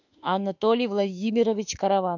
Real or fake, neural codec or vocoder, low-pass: fake; autoencoder, 48 kHz, 32 numbers a frame, DAC-VAE, trained on Japanese speech; 7.2 kHz